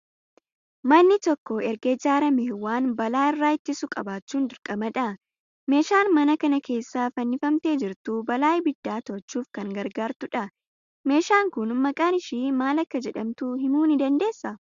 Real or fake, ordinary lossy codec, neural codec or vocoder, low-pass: real; Opus, 64 kbps; none; 7.2 kHz